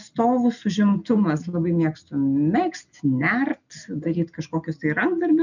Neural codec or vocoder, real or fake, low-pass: none; real; 7.2 kHz